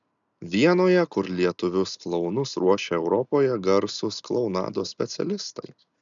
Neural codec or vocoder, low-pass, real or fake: none; 7.2 kHz; real